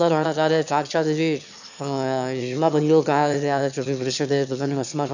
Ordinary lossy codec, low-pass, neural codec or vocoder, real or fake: none; 7.2 kHz; autoencoder, 22.05 kHz, a latent of 192 numbers a frame, VITS, trained on one speaker; fake